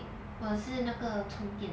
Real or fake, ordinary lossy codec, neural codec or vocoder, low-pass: real; none; none; none